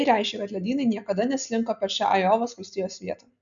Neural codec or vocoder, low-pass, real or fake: none; 7.2 kHz; real